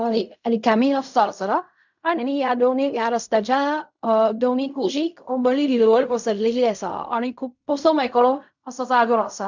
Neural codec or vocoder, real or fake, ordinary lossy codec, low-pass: codec, 16 kHz in and 24 kHz out, 0.4 kbps, LongCat-Audio-Codec, fine tuned four codebook decoder; fake; none; 7.2 kHz